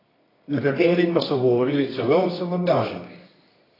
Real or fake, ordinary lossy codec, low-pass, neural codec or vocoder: fake; AAC, 24 kbps; 5.4 kHz; codec, 24 kHz, 0.9 kbps, WavTokenizer, medium music audio release